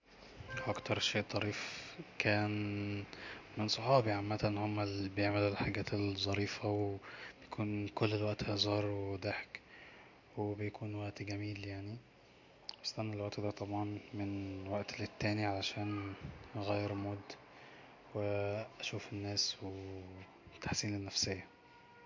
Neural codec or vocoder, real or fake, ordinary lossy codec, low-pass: none; real; MP3, 48 kbps; 7.2 kHz